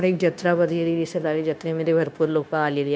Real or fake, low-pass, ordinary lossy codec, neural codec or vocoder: fake; none; none; codec, 16 kHz, 0.9 kbps, LongCat-Audio-Codec